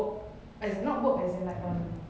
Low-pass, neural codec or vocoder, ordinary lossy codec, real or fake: none; none; none; real